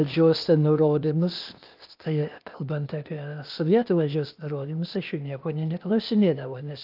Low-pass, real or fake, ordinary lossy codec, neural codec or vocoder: 5.4 kHz; fake; Opus, 24 kbps; codec, 16 kHz, 0.8 kbps, ZipCodec